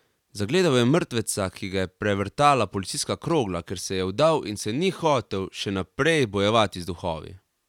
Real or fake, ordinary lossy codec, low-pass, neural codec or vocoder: real; none; 19.8 kHz; none